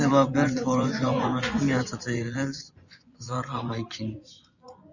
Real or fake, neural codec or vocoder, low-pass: fake; vocoder, 24 kHz, 100 mel bands, Vocos; 7.2 kHz